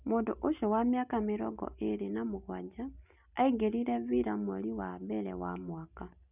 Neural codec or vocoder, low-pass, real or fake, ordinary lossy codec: none; 3.6 kHz; real; none